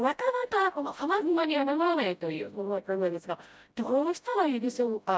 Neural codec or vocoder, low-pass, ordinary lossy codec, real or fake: codec, 16 kHz, 0.5 kbps, FreqCodec, smaller model; none; none; fake